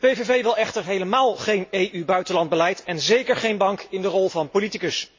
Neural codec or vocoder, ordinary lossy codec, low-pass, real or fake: none; MP3, 32 kbps; 7.2 kHz; real